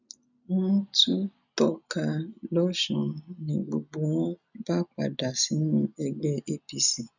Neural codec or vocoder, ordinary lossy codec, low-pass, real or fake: none; none; 7.2 kHz; real